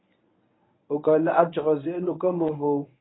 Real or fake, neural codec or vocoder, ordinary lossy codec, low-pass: fake; codec, 24 kHz, 0.9 kbps, WavTokenizer, medium speech release version 1; AAC, 16 kbps; 7.2 kHz